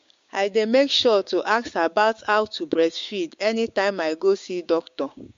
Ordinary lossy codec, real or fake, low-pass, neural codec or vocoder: MP3, 48 kbps; fake; 7.2 kHz; codec, 16 kHz, 6 kbps, DAC